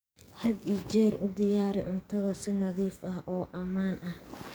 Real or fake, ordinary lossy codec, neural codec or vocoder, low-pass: fake; none; codec, 44.1 kHz, 2.6 kbps, SNAC; none